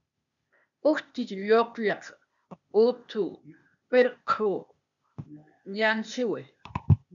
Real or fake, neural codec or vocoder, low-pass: fake; codec, 16 kHz, 0.8 kbps, ZipCodec; 7.2 kHz